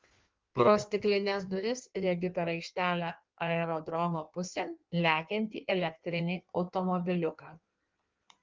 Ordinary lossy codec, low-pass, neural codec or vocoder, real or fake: Opus, 32 kbps; 7.2 kHz; codec, 16 kHz in and 24 kHz out, 1.1 kbps, FireRedTTS-2 codec; fake